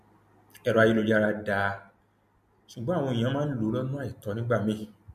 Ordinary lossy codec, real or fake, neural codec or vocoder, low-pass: MP3, 64 kbps; real; none; 14.4 kHz